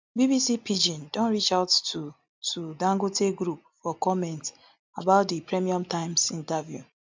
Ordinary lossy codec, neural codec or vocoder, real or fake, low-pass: MP3, 64 kbps; none; real; 7.2 kHz